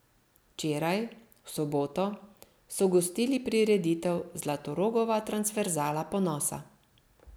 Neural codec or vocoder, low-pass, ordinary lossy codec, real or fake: none; none; none; real